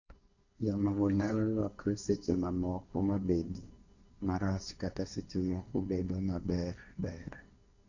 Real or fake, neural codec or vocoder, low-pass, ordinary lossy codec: fake; codec, 16 kHz, 1.1 kbps, Voila-Tokenizer; 7.2 kHz; none